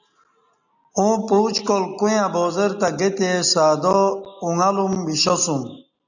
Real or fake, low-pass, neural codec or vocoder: real; 7.2 kHz; none